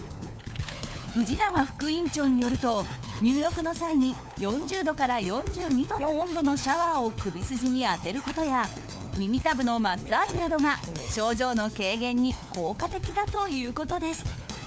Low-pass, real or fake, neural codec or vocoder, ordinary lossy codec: none; fake; codec, 16 kHz, 4 kbps, FunCodec, trained on LibriTTS, 50 frames a second; none